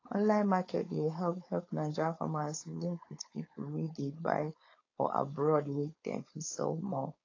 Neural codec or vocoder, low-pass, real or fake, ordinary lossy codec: codec, 16 kHz, 4.8 kbps, FACodec; 7.2 kHz; fake; AAC, 32 kbps